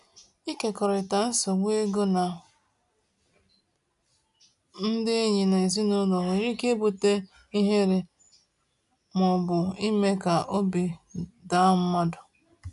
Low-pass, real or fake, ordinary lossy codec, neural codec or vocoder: 10.8 kHz; real; none; none